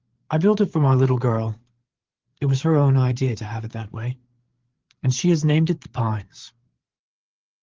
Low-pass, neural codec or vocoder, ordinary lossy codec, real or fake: 7.2 kHz; codec, 16 kHz, 8 kbps, FreqCodec, larger model; Opus, 16 kbps; fake